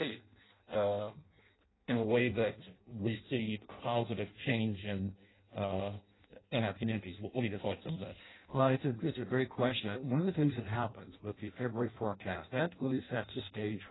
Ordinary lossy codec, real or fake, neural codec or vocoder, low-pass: AAC, 16 kbps; fake; codec, 16 kHz in and 24 kHz out, 0.6 kbps, FireRedTTS-2 codec; 7.2 kHz